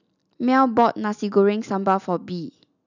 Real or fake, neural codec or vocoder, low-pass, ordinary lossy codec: real; none; 7.2 kHz; none